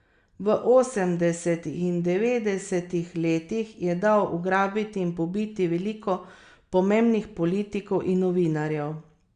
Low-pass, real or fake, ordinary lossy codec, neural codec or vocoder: 9.9 kHz; real; Opus, 64 kbps; none